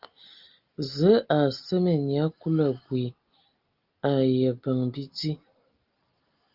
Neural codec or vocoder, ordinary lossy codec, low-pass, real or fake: none; Opus, 32 kbps; 5.4 kHz; real